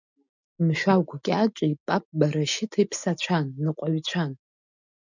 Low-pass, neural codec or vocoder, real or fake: 7.2 kHz; none; real